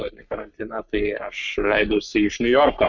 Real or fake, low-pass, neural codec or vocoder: fake; 7.2 kHz; codec, 44.1 kHz, 3.4 kbps, Pupu-Codec